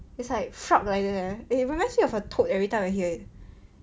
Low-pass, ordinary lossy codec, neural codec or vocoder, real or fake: none; none; none; real